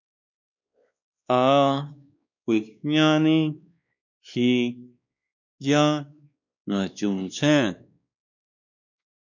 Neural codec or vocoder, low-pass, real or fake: codec, 16 kHz, 2 kbps, X-Codec, WavLM features, trained on Multilingual LibriSpeech; 7.2 kHz; fake